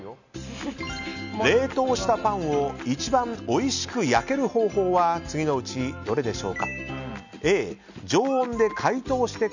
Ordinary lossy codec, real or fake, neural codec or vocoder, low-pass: none; real; none; 7.2 kHz